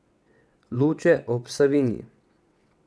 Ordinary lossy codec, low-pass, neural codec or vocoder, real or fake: none; none; vocoder, 22.05 kHz, 80 mel bands, WaveNeXt; fake